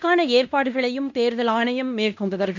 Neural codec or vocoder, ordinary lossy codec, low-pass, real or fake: codec, 16 kHz in and 24 kHz out, 0.9 kbps, LongCat-Audio-Codec, fine tuned four codebook decoder; none; 7.2 kHz; fake